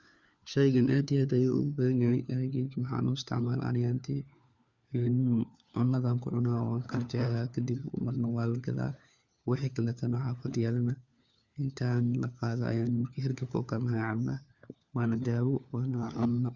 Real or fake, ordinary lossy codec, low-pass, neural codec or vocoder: fake; Opus, 64 kbps; 7.2 kHz; codec, 16 kHz, 4 kbps, FunCodec, trained on LibriTTS, 50 frames a second